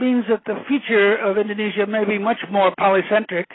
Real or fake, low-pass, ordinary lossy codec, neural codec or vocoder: real; 7.2 kHz; AAC, 16 kbps; none